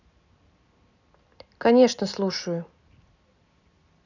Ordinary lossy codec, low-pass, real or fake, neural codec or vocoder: none; 7.2 kHz; real; none